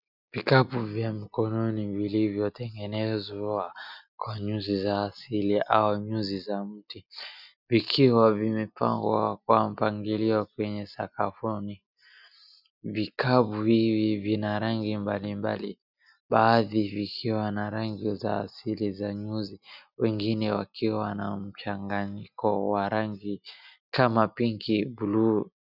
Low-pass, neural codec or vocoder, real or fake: 5.4 kHz; none; real